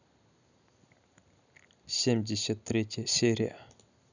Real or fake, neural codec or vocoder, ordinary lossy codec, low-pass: real; none; none; 7.2 kHz